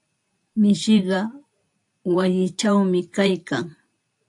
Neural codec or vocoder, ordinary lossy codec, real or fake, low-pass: vocoder, 44.1 kHz, 128 mel bands every 512 samples, BigVGAN v2; AAC, 64 kbps; fake; 10.8 kHz